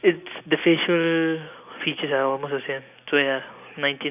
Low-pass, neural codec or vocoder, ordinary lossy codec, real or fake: 3.6 kHz; none; none; real